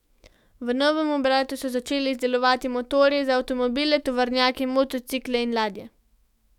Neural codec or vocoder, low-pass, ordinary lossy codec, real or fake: autoencoder, 48 kHz, 128 numbers a frame, DAC-VAE, trained on Japanese speech; 19.8 kHz; none; fake